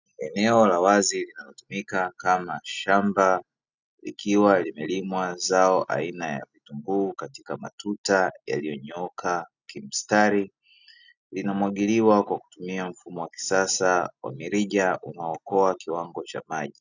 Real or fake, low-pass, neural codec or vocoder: real; 7.2 kHz; none